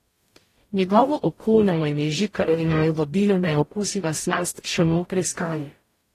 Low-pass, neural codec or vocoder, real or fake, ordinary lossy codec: 14.4 kHz; codec, 44.1 kHz, 0.9 kbps, DAC; fake; AAC, 48 kbps